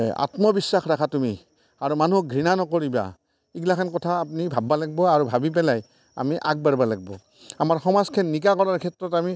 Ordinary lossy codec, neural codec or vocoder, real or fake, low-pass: none; none; real; none